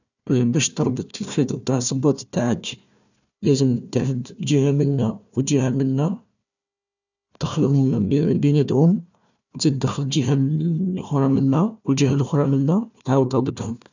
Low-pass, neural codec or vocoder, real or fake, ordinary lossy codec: 7.2 kHz; codec, 16 kHz, 1 kbps, FunCodec, trained on Chinese and English, 50 frames a second; fake; none